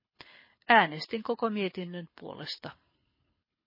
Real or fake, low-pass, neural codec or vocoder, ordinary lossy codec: fake; 5.4 kHz; codec, 24 kHz, 6 kbps, HILCodec; MP3, 24 kbps